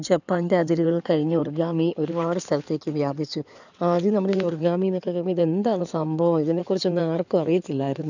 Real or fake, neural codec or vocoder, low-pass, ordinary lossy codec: fake; codec, 16 kHz in and 24 kHz out, 2.2 kbps, FireRedTTS-2 codec; 7.2 kHz; none